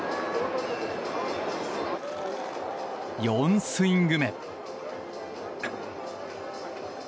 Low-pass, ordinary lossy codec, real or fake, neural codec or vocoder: none; none; real; none